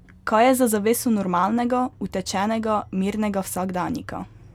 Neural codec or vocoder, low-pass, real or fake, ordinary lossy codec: none; 19.8 kHz; real; none